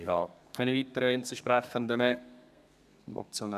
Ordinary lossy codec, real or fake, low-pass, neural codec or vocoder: none; fake; 14.4 kHz; codec, 32 kHz, 1.9 kbps, SNAC